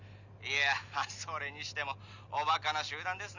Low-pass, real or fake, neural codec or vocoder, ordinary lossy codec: 7.2 kHz; real; none; none